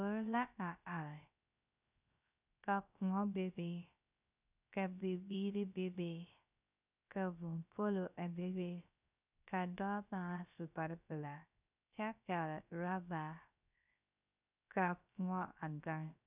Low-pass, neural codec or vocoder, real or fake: 3.6 kHz; codec, 16 kHz, 0.3 kbps, FocalCodec; fake